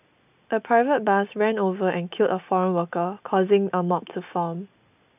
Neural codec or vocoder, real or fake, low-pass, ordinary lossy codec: none; real; 3.6 kHz; none